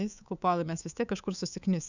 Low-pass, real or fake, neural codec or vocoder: 7.2 kHz; fake; codec, 24 kHz, 3.1 kbps, DualCodec